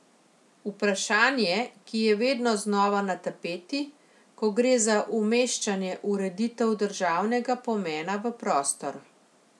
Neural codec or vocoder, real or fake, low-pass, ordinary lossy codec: none; real; none; none